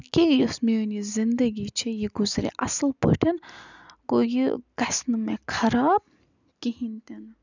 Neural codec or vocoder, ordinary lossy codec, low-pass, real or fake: none; none; 7.2 kHz; real